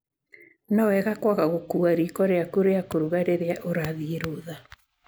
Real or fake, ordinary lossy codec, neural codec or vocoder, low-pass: real; none; none; none